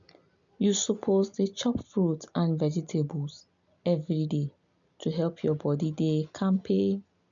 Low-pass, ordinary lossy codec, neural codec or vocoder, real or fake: 7.2 kHz; none; none; real